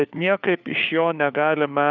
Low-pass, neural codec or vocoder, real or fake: 7.2 kHz; codec, 16 kHz, 4 kbps, FunCodec, trained on LibriTTS, 50 frames a second; fake